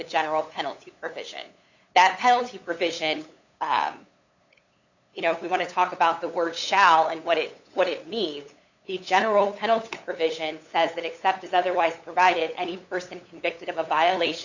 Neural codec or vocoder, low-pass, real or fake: codec, 16 kHz, 8 kbps, FunCodec, trained on LibriTTS, 25 frames a second; 7.2 kHz; fake